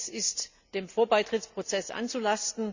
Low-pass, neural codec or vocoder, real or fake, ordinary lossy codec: 7.2 kHz; none; real; Opus, 64 kbps